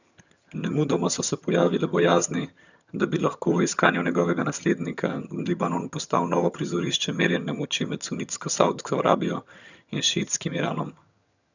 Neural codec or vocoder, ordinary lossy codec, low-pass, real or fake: vocoder, 22.05 kHz, 80 mel bands, HiFi-GAN; none; 7.2 kHz; fake